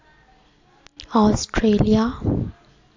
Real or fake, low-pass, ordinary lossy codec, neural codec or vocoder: real; 7.2 kHz; AAC, 48 kbps; none